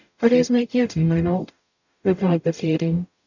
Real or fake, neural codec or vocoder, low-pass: fake; codec, 44.1 kHz, 0.9 kbps, DAC; 7.2 kHz